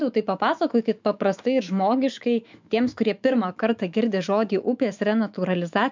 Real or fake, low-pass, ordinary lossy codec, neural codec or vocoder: fake; 7.2 kHz; MP3, 64 kbps; autoencoder, 48 kHz, 128 numbers a frame, DAC-VAE, trained on Japanese speech